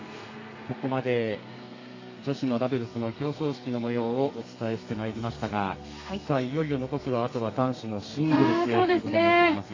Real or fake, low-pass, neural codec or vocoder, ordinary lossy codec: fake; 7.2 kHz; codec, 32 kHz, 1.9 kbps, SNAC; AAC, 32 kbps